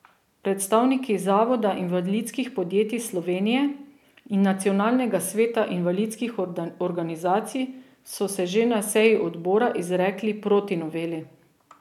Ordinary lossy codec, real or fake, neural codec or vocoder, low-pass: none; real; none; 19.8 kHz